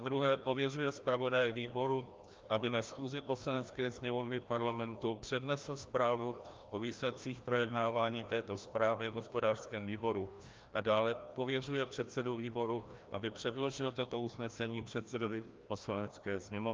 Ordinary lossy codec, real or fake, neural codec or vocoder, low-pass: Opus, 24 kbps; fake; codec, 16 kHz, 1 kbps, FreqCodec, larger model; 7.2 kHz